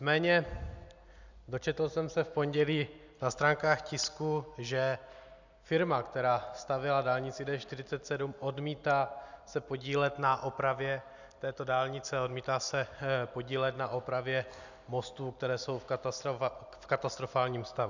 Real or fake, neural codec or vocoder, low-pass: real; none; 7.2 kHz